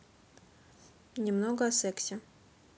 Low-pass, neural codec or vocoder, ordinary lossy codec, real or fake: none; none; none; real